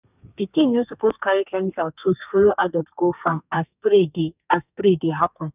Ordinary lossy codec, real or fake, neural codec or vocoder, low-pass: none; fake; codec, 32 kHz, 1.9 kbps, SNAC; 3.6 kHz